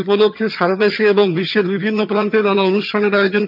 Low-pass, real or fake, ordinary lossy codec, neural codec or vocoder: 5.4 kHz; fake; none; vocoder, 22.05 kHz, 80 mel bands, HiFi-GAN